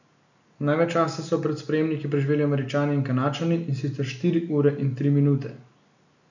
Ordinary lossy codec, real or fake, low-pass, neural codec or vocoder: none; real; 7.2 kHz; none